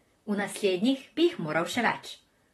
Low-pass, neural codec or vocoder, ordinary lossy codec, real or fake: 19.8 kHz; vocoder, 44.1 kHz, 128 mel bands, Pupu-Vocoder; AAC, 32 kbps; fake